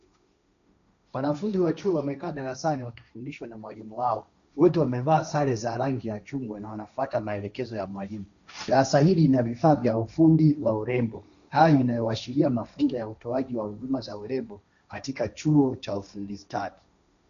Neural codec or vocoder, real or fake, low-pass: codec, 16 kHz, 1.1 kbps, Voila-Tokenizer; fake; 7.2 kHz